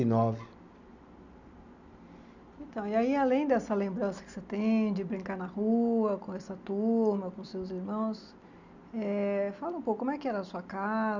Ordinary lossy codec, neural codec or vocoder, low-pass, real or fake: none; none; 7.2 kHz; real